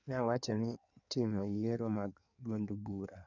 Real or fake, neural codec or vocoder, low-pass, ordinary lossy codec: fake; codec, 16 kHz in and 24 kHz out, 1.1 kbps, FireRedTTS-2 codec; 7.2 kHz; none